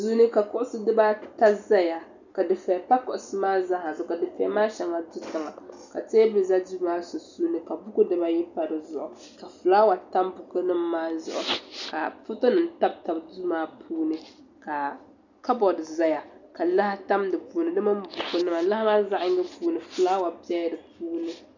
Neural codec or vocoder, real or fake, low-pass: none; real; 7.2 kHz